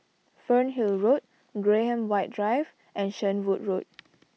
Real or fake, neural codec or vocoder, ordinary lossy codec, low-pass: real; none; none; none